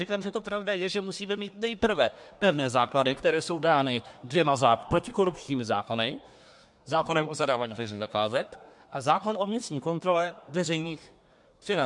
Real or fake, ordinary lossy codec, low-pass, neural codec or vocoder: fake; MP3, 64 kbps; 10.8 kHz; codec, 24 kHz, 1 kbps, SNAC